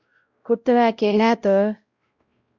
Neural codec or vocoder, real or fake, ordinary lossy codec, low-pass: codec, 16 kHz, 0.5 kbps, X-Codec, WavLM features, trained on Multilingual LibriSpeech; fake; Opus, 64 kbps; 7.2 kHz